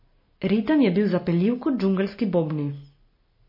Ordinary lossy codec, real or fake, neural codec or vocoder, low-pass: MP3, 24 kbps; real; none; 5.4 kHz